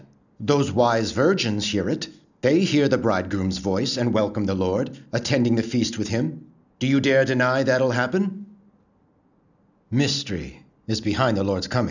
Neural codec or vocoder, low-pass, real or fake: none; 7.2 kHz; real